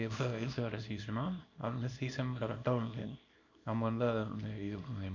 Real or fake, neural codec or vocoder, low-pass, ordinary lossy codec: fake; codec, 24 kHz, 0.9 kbps, WavTokenizer, small release; 7.2 kHz; none